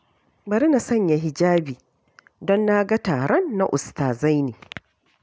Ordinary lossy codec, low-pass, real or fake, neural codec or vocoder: none; none; real; none